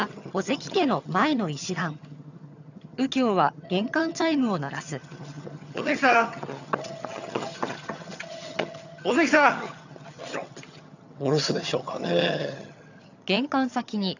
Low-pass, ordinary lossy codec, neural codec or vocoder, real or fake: 7.2 kHz; none; vocoder, 22.05 kHz, 80 mel bands, HiFi-GAN; fake